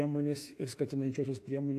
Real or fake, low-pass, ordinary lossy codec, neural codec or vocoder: fake; 14.4 kHz; AAC, 64 kbps; autoencoder, 48 kHz, 32 numbers a frame, DAC-VAE, trained on Japanese speech